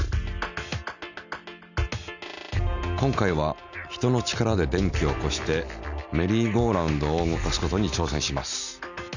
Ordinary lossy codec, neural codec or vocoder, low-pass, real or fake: none; none; 7.2 kHz; real